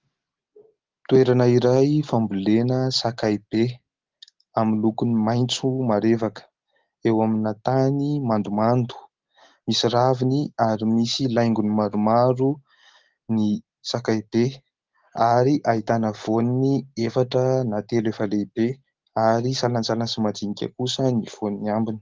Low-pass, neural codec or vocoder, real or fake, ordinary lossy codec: 7.2 kHz; none; real; Opus, 16 kbps